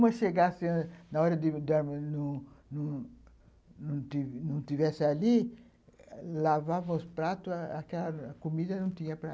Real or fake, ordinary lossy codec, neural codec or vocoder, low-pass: real; none; none; none